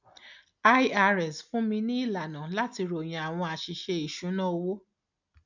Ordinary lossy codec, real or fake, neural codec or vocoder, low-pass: none; real; none; 7.2 kHz